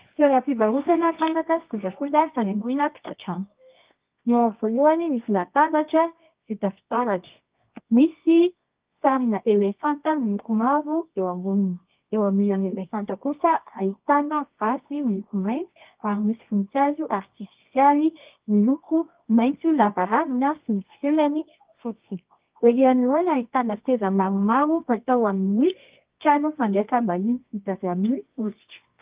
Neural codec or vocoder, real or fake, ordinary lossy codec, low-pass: codec, 24 kHz, 0.9 kbps, WavTokenizer, medium music audio release; fake; Opus, 32 kbps; 3.6 kHz